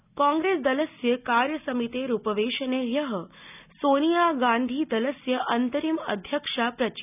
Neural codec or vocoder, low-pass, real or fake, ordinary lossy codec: none; 3.6 kHz; real; none